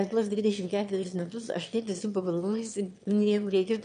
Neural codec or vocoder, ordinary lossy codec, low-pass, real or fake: autoencoder, 22.05 kHz, a latent of 192 numbers a frame, VITS, trained on one speaker; MP3, 64 kbps; 9.9 kHz; fake